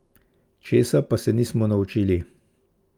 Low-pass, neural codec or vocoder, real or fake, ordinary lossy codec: 19.8 kHz; none; real; Opus, 32 kbps